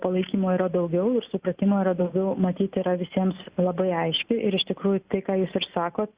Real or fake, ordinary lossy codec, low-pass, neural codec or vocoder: real; Opus, 64 kbps; 3.6 kHz; none